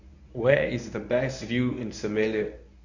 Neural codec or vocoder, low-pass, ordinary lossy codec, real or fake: codec, 24 kHz, 0.9 kbps, WavTokenizer, medium speech release version 1; 7.2 kHz; none; fake